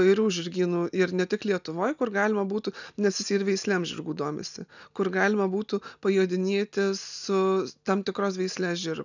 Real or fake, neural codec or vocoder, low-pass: real; none; 7.2 kHz